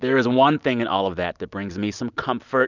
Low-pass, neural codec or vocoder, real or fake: 7.2 kHz; none; real